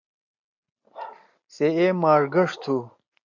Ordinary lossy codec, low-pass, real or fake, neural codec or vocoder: AAC, 48 kbps; 7.2 kHz; real; none